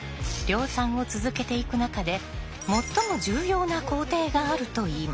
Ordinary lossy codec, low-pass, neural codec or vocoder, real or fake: none; none; none; real